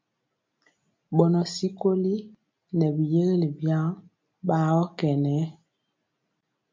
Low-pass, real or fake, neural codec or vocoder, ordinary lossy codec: 7.2 kHz; real; none; MP3, 48 kbps